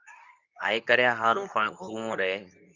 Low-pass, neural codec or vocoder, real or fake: 7.2 kHz; codec, 24 kHz, 0.9 kbps, WavTokenizer, medium speech release version 2; fake